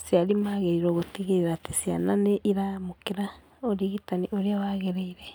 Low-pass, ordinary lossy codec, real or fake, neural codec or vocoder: none; none; real; none